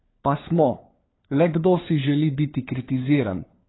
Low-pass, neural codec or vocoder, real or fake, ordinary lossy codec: 7.2 kHz; codec, 16 kHz, 4 kbps, FunCodec, trained on LibriTTS, 50 frames a second; fake; AAC, 16 kbps